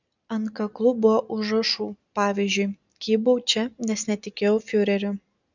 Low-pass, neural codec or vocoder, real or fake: 7.2 kHz; none; real